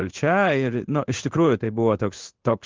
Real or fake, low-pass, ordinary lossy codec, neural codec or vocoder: fake; 7.2 kHz; Opus, 32 kbps; codec, 16 kHz in and 24 kHz out, 1 kbps, XY-Tokenizer